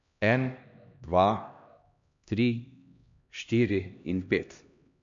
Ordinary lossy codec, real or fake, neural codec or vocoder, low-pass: MP3, 64 kbps; fake; codec, 16 kHz, 1 kbps, X-Codec, HuBERT features, trained on LibriSpeech; 7.2 kHz